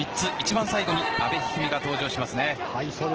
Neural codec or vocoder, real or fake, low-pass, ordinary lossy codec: none; real; 7.2 kHz; Opus, 16 kbps